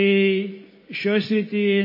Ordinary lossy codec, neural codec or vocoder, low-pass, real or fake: MP3, 24 kbps; none; 5.4 kHz; real